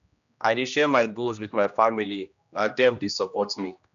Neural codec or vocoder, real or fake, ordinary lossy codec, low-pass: codec, 16 kHz, 1 kbps, X-Codec, HuBERT features, trained on general audio; fake; none; 7.2 kHz